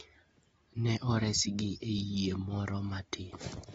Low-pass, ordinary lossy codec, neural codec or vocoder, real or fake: 14.4 kHz; AAC, 24 kbps; none; real